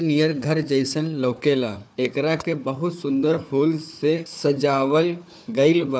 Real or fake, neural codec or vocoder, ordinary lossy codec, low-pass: fake; codec, 16 kHz, 4 kbps, FunCodec, trained on Chinese and English, 50 frames a second; none; none